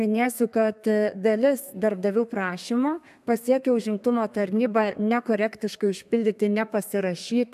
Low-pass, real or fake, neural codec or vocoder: 14.4 kHz; fake; codec, 32 kHz, 1.9 kbps, SNAC